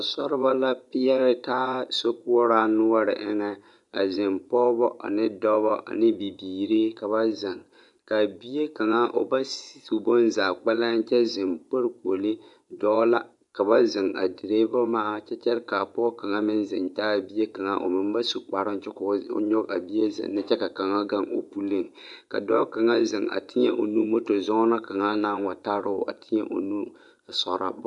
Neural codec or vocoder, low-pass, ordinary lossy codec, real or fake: vocoder, 24 kHz, 100 mel bands, Vocos; 10.8 kHz; MP3, 96 kbps; fake